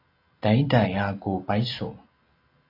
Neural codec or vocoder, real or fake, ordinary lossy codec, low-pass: none; real; AAC, 32 kbps; 5.4 kHz